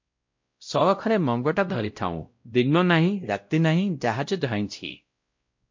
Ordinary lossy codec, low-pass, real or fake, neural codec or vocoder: MP3, 48 kbps; 7.2 kHz; fake; codec, 16 kHz, 0.5 kbps, X-Codec, WavLM features, trained on Multilingual LibriSpeech